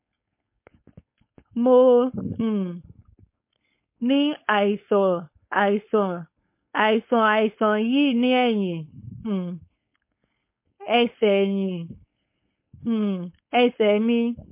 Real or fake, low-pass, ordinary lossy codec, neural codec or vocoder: fake; 3.6 kHz; MP3, 24 kbps; codec, 16 kHz, 4.8 kbps, FACodec